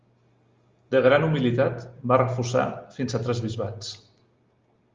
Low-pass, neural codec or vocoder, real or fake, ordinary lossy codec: 7.2 kHz; none; real; Opus, 32 kbps